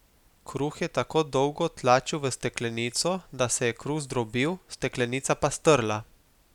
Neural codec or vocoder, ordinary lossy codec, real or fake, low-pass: none; none; real; 19.8 kHz